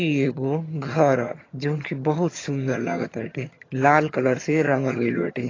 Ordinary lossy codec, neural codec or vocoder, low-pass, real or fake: AAC, 32 kbps; vocoder, 22.05 kHz, 80 mel bands, HiFi-GAN; 7.2 kHz; fake